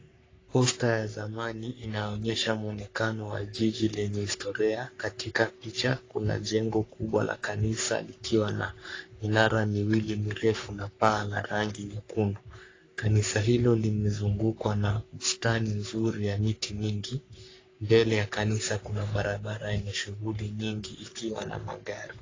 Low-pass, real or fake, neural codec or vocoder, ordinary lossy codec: 7.2 kHz; fake; codec, 44.1 kHz, 3.4 kbps, Pupu-Codec; AAC, 32 kbps